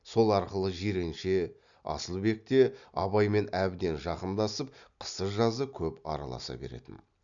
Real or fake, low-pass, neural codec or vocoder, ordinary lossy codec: real; 7.2 kHz; none; none